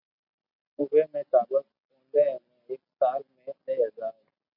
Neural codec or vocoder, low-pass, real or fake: none; 5.4 kHz; real